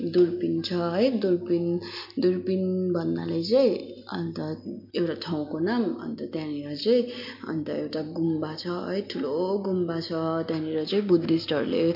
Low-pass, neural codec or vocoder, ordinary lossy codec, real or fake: 5.4 kHz; none; MP3, 32 kbps; real